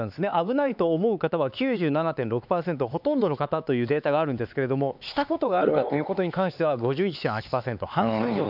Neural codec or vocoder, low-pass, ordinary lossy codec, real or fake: codec, 16 kHz, 4 kbps, X-Codec, HuBERT features, trained on LibriSpeech; 5.4 kHz; none; fake